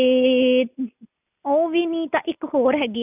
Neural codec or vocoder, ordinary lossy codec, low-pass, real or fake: none; none; 3.6 kHz; real